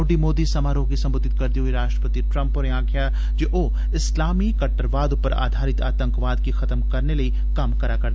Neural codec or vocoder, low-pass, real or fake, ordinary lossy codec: none; none; real; none